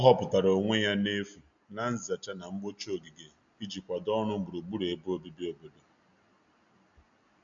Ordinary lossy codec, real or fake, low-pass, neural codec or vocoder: none; real; 7.2 kHz; none